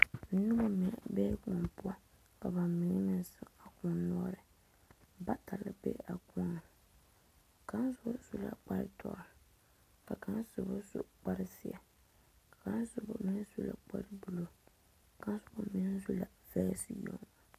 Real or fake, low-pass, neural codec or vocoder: real; 14.4 kHz; none